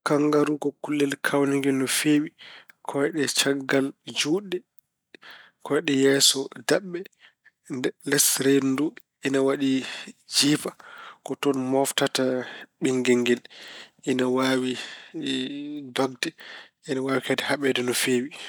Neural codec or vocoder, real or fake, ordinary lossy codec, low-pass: none; real; none; none